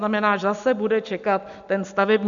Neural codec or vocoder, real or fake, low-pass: none; real; 7.2 kHz